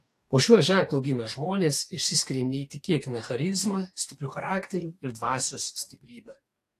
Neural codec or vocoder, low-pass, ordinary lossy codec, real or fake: codec, 44.1 kHz, 2.6 kbps, DAC; 14.4 kHz; AAC, 64 kbps; fake